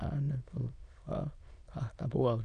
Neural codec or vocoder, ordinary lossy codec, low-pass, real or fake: autoencoder, 22.05 kHz, a latent of 192 numbers a frame, VITS, trained on many speakers; AAC, 96 kbps; 9.9 kHz; fake